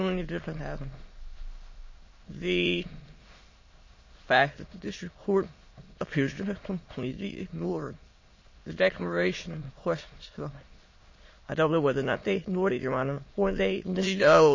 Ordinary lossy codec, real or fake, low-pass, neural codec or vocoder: MP3, 32 kbps; fake; 7.2 kHz; autoencoder, 22.05 kHz, a latent of 192 numbers a frame, VITS, trained on many speakers